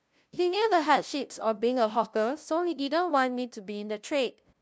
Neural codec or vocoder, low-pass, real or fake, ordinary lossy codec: codec, 16 kHz, 0.5 kbps, FunCodec, trained on LibriTTS, 25 frames a second; none; fake; none